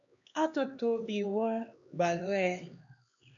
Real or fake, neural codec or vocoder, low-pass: fake; codec, 16 kHz, 2 kbps, X-Codec, HuBERT features, trained on LibriSpeech; 7.2 kHz